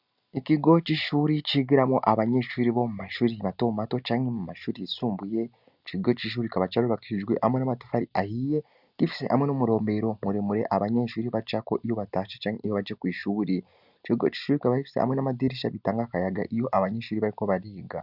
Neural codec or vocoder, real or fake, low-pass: none; real; 5.4 kHz